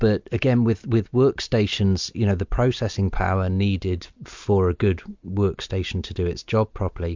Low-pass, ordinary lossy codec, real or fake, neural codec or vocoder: 7.2 kHz; MP3, 64 kbps; real; none